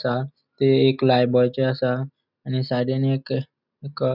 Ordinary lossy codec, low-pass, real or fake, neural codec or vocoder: none; 5.4 kHz; real; none